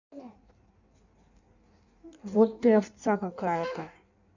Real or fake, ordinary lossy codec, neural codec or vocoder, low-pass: fake; none; codec, 16 kHz in and 24 kHz out, 1.1 kbps, FireRedTTS-2 codec; 7.2 kHz